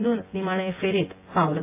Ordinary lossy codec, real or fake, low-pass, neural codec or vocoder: AAC, 24 kbps; fake; 3.6 kHz; vocoder, 24 kHz, 100 mel bands, Vocos